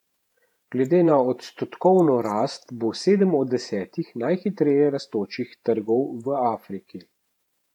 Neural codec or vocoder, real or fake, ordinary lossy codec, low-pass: vocoder, 48 kHz, 128 mel bands, Vocos; fake; none; 19.8 kHz